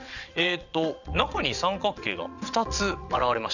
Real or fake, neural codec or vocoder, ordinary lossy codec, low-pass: fake; vocoder, 22.05 kHz, 80 mel bands, WaveNeXt; none; 7.2 kHz